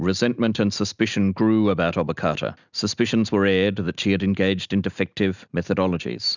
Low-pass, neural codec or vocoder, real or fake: 7.2 kHz; none; real